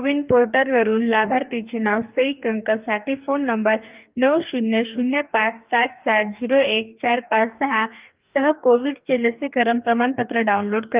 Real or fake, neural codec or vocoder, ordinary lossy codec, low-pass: fake; codec, 44.1 kHz, 2.6 kbps, DAC; Opus, 24 kbps; 3.6 kHz